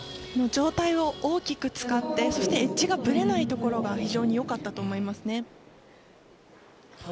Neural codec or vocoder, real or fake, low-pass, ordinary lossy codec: none; real; none; none